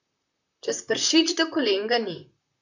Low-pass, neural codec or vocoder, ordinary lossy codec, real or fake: 7.2 kHz; vocoder, 44.1 kHz, 128 mel bands, Pupu-Vocoder; none; fake